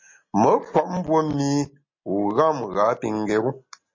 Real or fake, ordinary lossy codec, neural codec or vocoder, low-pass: real; MP3, 32 kbps; none; 7.2 kHz